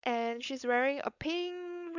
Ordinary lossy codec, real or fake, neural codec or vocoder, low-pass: none; fake; codec, 16 kHz, 4.8 kbps, FACodec; 7.2 kHz